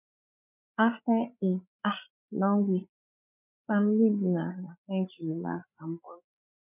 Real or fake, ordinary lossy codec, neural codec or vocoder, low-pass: fake; none; codec, 16 kHz, 8 kbps, FreqCodec, larger model; 3.6 kHz